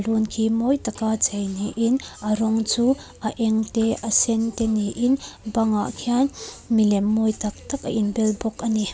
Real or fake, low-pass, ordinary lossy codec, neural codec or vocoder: real; none; none; none